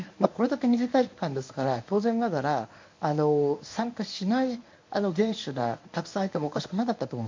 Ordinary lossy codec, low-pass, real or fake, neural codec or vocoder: MP3, 48 kbps; 7.2 kHz; fake; codec, 24 kHz, 0.9 kbps, WavTokenizer, medium speech release version 1